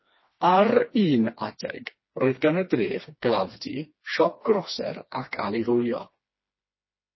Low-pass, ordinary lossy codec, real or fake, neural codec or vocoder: 7.2 kHz; MP3, 24 kbps; fake; codec, 16 kHz, 2 kbps, FreqCodec, smaller model